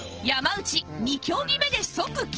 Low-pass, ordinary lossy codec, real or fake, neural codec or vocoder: 7.2 kHz; Opus, 16 kbps; real; none